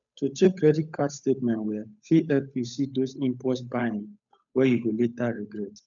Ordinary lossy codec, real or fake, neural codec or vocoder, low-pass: none; fake; codec, 16 kHz, 8 kbps, FunCodec, trained on Chinese and English, 25 frames a second; 7.2 kHz